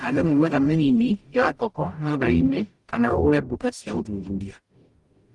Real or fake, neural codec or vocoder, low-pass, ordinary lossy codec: fake; codec, 44.1 kHz, 0.9 kbps, DAC; 10.8 kHz; Opus, 24 kbps